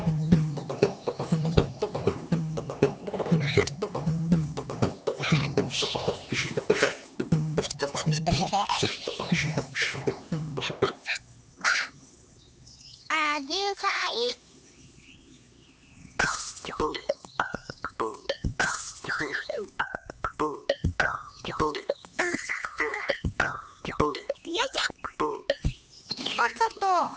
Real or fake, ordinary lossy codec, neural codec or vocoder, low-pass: fake; none; codec, 16 kHz, 2 kbps, X-Codec, HuBERT features, trained on LibriSpeech; none